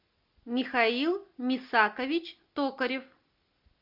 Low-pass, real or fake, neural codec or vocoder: 5.4 kHz; real; none